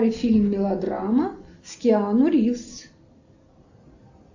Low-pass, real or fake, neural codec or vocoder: 7.2 kHz; real; none